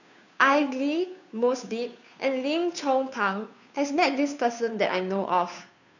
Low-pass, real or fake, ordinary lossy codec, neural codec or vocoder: 7.2 kHz; fake; none; codec, 16 kHz, 2 kbps, FunCodec, trained on Chinese and English, 25 frames a second